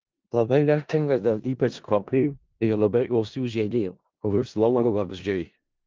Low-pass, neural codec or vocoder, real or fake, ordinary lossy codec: 7.2 kHz; codec, 16 kHz in and 24 kHz out, 0.4 kbps, LongCat-Audio-Codec, four codebook decoder; fake; Opus, 32 kbps